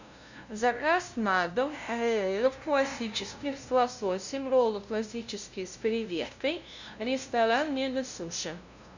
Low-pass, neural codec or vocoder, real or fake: 7.2 kHz; codec, 16 kHz, 0.5 kbps, FunCodec, trained on LibriTTS, 25 frames a second; fake